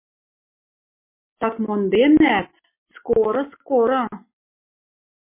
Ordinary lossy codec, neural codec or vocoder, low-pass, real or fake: MP3, 24 kbps; none; 3.6 kHz; real